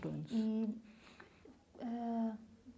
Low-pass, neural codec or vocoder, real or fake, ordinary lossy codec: none; none; real; none